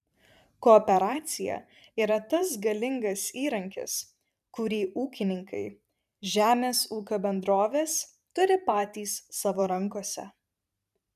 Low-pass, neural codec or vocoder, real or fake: 14.4 kHz; vocoder, 44.1 kHz, 128 mel bands every 512 samples, BigVGAN v2; fake